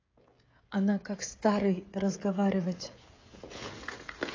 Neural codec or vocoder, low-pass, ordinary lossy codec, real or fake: codec, 16 kHz, 16 kbps, FreqCodec, smaller model; 7.2 kHz; AAC, 32 kbps; fake